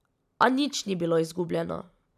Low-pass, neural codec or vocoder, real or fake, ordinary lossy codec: 14.4 kHz; vocoder, 44.1 kHz, 128 mel bands every 256 samples, BigVGAN v2; fake; none